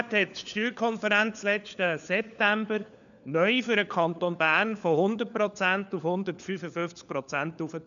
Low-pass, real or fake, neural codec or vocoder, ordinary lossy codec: 7.2 kHz; fake; codec, 16 kHz, 4 kbps, FunCodec, trained on LibriTTS, 50 frames a second; none